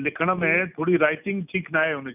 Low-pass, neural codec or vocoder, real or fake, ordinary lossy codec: 3.6 kHz; none; real; none